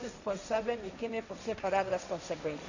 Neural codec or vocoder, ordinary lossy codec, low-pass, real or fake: codec, 16 kHz, 1.1 kbps, Voila-Tokenizer; none; 7.2 kHz; fake